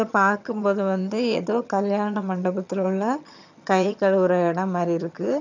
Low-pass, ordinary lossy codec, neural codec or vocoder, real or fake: 7.2 kHz; none; vocoder, 22.05 kHz, 80 mel bands, HiFi-GAN; fake